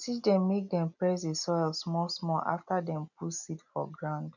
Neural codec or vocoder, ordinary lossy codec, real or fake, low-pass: none; none; real; 7.2 kHz